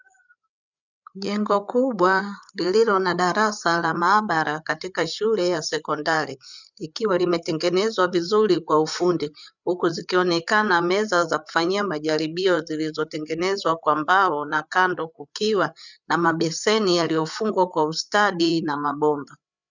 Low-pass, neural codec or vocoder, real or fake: 7.2 kHz; codec, 16 kHz, 8 kbps, FreqCodec, larger model; fake